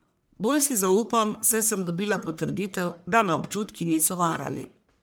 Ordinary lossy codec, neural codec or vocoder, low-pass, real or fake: none; codec, 44.1 kHz, 1.7 kbps, Pupu-Codec; none; fake